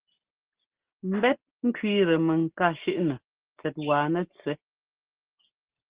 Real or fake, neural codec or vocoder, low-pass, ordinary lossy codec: real; none; 3.6 kHz; Opus, 16 kbps